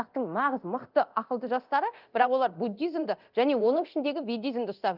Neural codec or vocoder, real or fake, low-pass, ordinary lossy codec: codec, 24 kHz, 0.9 kbps, DualCodec; fake; 5.4 kHz; Opus, 24 kbps